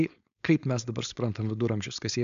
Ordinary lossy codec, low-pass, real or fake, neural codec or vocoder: AAC, 96 kbps; 7.2 kHz; fake; codec, 16 kHz, 4.8 kbps, FACodec